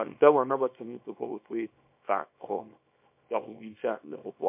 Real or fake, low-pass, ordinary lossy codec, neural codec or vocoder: fake; 3.6 kHz; MP3, 32 kbps; codec, 24 kHz, 0.9 kbps, WavTokenizer, small release